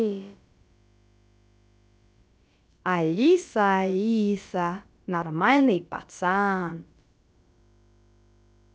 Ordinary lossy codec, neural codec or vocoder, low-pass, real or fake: none; codec, 16 kHz, about 1 kbps, DyCAST, with the encoder's durations; none; fake